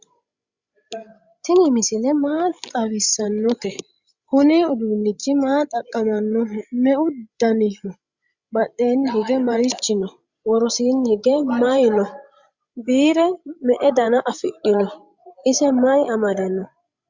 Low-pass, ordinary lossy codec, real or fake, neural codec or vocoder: 7.2 kHz; Opus, 64 kbps; fake; codec, 16 kHz, 16 kbps, FreqCodec, larger model